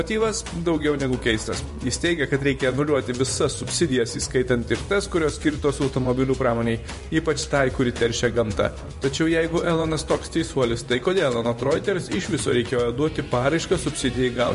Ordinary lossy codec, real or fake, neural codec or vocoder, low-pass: MP3, 48 kbps; fake; vocoder, 24 kHz, 100 mel bands, Vocos; 10.8 kHz